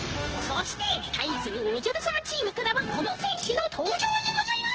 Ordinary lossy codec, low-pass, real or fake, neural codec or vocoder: Opus, 16 kbps; 7.2 kHz; fake; codec, 16 kHz, 0.9 kbps, LongCat-Audio-Codec